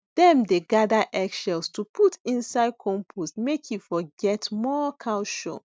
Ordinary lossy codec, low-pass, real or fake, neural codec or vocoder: none; none; real; none